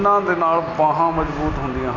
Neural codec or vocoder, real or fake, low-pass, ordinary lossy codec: none; real; 7.2 kHz; none